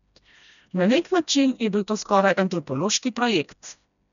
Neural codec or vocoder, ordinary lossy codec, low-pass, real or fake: codec, 16 kHz, 1 kbps, FreqCodec, smaller model; none; 7.2 kHz; fake